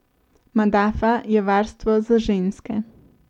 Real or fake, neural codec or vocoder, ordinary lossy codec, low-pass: real; none; MP3, 96 kbps; 19.8 kHz